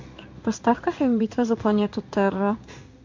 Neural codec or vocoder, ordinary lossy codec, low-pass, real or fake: codec, 16 kHz in and 24 kHz out, 1 kbps, XY-Tokenizer; MP3, 48 kbps; 7.2 kHz; fake